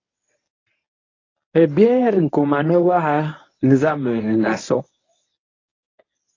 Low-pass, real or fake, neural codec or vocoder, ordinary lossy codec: 7.2 kHz; fake; codec, 24 kHz, 0.9 kbps, WavTokenizer, medium speech release version 1; AAC, 32 kbps